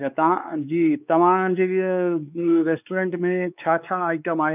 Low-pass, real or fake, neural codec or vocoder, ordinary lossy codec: 3.6 kHz; fake; codec, 16 kHz, 4 kbps, X-Codec, WavLM features, trained on Multilingual LibriSpeech; none